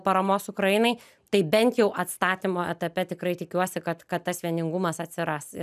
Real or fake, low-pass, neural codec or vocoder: real; 14.4 kHz; none